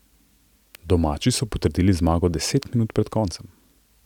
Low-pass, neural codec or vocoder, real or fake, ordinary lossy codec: 19.8 kHz; none; real; none